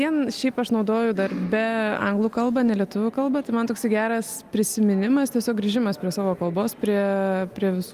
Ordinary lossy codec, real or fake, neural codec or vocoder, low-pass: Opus, 32 kbps; real; none; 14.4 kHz